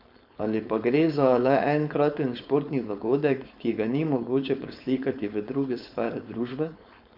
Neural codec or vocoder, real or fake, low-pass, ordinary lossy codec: codec, 16 kHz, 4.8 kbps, FACodec; fake; 5.4 kHz; MP3, 48 kbps